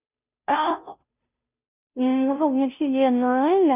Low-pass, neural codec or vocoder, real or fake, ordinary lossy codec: 3.6 kHz; codec, 16 kHz, 0.5 kbps, FunCodec, trained on Chinese and English, 25 frames a second; fake; none